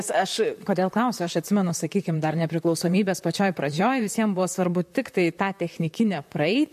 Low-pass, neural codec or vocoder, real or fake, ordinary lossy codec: 14.4 kHz; vocoder, 44.1 kHz, 128 mel bands, Pupu-Vocoder; fake; MP3, 64 kbps